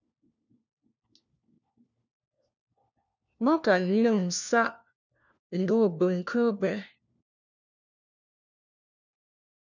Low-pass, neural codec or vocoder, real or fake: 7.2 kHz; codec, 16 kHz, 1 kbps, FunCodec, trained on LibriTTS, 50 frames a second; fake